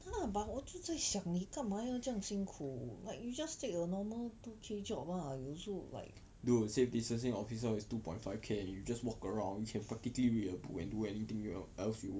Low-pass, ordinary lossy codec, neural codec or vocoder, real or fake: none; none; none; real